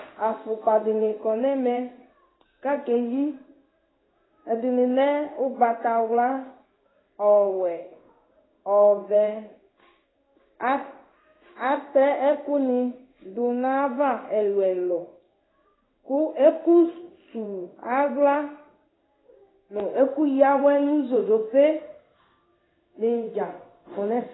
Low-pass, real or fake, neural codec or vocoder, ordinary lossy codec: 7.2 kHz; fake; codec, 16 kHz in and 24 kHz out, 1 kbps, XY-Tokenizer; AAC, 16 kbps